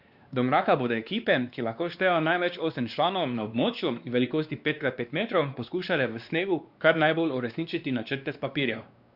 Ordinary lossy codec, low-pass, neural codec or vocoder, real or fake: Opus, 64 kbps; 5.4 kHz; codec, 16 kHz, 2 kbps, X-Codec, WavLM features, trained on Multilingual LibriSpeech; fake